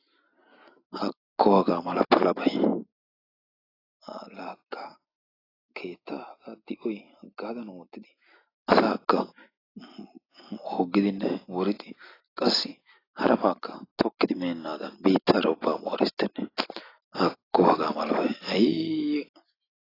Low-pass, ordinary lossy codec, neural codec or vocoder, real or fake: 5.4 kHz; AAC, 24 kbps; none; real